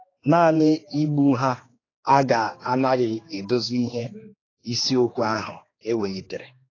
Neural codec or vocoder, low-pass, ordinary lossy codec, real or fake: codec, 16 kHz, 2 kbps, X-Codec, HuBERT features, trained on general audio; 7.2 kHz; AAC, 32 kbps; fake